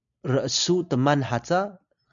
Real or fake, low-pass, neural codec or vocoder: real; 7.2 kHz; none